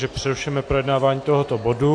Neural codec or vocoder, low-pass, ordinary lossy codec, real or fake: none; 9.9 kHz; AAC, 48 kbps; real